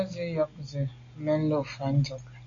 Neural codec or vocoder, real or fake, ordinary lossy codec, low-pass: none; real; AAC, 48 kbps; 7.2 kHz